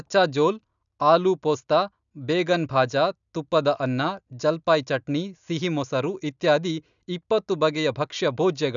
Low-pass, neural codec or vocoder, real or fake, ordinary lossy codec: 7.2 kHz; none; real; none